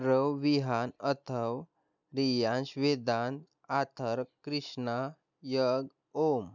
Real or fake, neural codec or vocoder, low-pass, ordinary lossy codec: real; none; 7.2 kHz; none